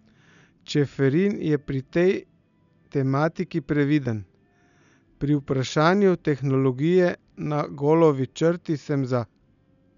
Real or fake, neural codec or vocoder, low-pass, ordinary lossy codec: real; none; 7.2 kHz; none